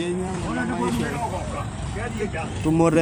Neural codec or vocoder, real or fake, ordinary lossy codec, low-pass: vocoder, 44.1 kHz, 128 mel bands every 256 samples, BigVGAN v2; fake; none; none